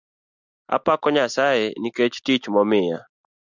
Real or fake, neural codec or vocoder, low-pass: real; none; 7.2 kHz